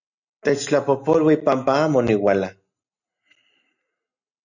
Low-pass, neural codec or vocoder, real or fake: 7.2 kHz; none; real